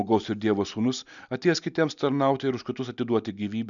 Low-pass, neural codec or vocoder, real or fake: 7.2 kHz; none; real